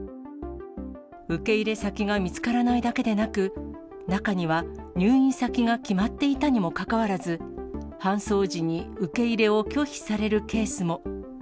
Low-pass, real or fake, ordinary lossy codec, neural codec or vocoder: none; real; none; none